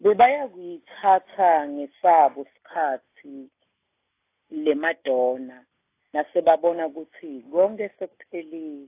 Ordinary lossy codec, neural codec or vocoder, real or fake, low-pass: AAC, 24 kbps; none; real; 3.6 kHz